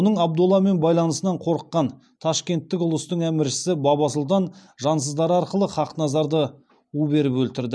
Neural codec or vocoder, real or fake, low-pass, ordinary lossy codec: none; real; none; none